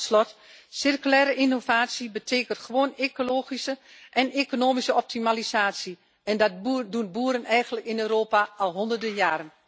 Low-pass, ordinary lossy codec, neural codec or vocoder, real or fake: none; none; none; real